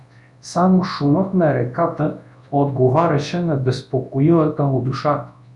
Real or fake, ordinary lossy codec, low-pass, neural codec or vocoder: fake; Opus, 64 kbps; 10.8 kHz; codec, 24 kHz, 0.9 kbps, WavTokenizer, large speech release